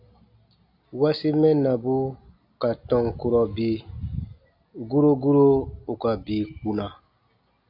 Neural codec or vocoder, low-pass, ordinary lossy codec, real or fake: none; 5.4 kHz; AAC, 48 kbps; real